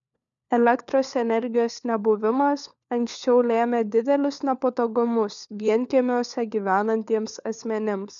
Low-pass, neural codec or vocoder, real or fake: 7.2 kHz; codec, 16 kHz, 4 kbps, FunCodec, trained on LibriTTS, 50 frames a second; fake